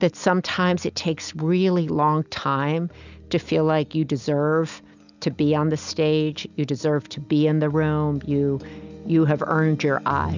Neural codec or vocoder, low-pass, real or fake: none; 7.2 kHz; real